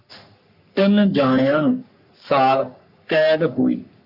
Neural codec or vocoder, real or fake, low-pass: codec, 44.1 kHz, 3.4 kbps, Pupu-Codec; fake; 5.4 kHz